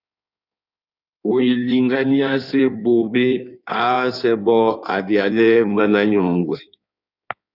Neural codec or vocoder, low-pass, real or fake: codec, 16 kHz in and 24 kHz out, 1.1 kbps, FireRedTTS-2 codec; 5.4 kHz; fake